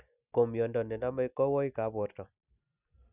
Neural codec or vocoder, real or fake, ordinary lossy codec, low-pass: none; real; none; 3.6 kHz